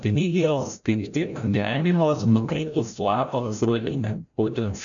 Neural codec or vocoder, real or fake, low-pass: codec, 16 kHz, 0.5 kbps, FreqCodec, larger model; fake; 7.2 kHz